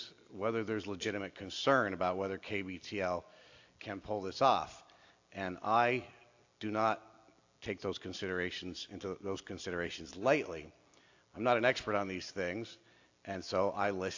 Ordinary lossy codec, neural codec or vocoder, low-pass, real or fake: AAC, 48 kbps; none; 7.2 kHz; real